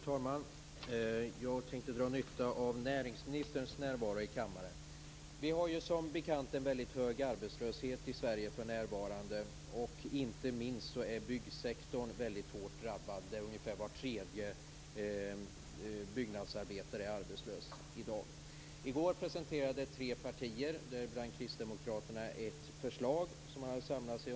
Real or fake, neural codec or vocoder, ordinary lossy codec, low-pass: real; none; none; none